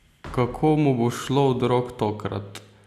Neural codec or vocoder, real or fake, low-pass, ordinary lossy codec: none; real; 14.4 kHz; none